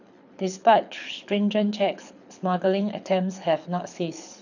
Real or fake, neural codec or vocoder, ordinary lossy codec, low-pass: fake; codec, 24 kHz, 6 kbps, HILCodec; none; 7.2 kHz